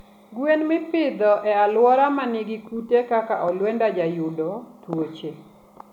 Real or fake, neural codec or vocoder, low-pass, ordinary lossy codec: real; none; 19.8 kHz; none